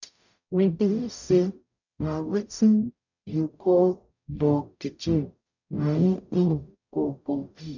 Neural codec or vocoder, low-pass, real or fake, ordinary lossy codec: codec, 44.1 kHz, 0.9 kbps, DAC; 7.2 kHz; fake; none